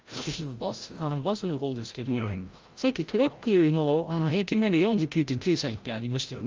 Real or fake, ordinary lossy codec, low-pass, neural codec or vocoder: fake; Opus, 32 kbps; 7.2 kHz; codec, 16 kHz, 0.5 kbps, FreqCodec, larger model